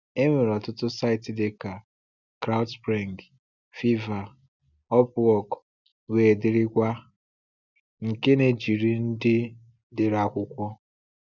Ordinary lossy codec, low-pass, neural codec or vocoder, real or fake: none; 7.2 kHz; none; real